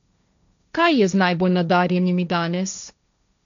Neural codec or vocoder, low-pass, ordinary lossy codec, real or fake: codec, 16 kHz, 1.1 kbps, Voila-Tokenizer; 7.2 kHz; none; fake